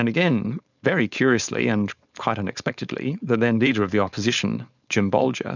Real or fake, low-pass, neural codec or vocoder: fake; 7.2 kHz; codec, 16 kHz, 4.8 kbps, FACodec